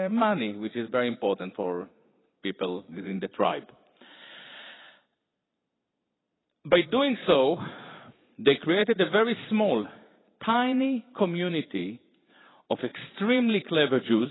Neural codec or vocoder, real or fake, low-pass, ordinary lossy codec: vocoder, 22.05 kHz, 80 mel bands, Vocos; fake; 7.2 kHz; AAC, 16 kbps